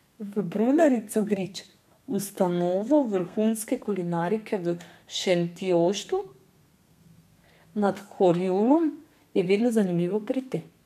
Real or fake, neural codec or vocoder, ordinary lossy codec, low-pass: fake; codec, 32 kHz, 1.9 kbps, SNAC; none; 14.4 kHz